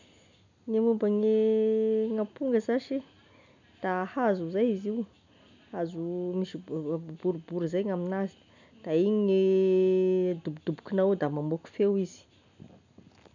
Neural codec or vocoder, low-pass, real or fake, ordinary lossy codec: none; 7.2 kHz; real; none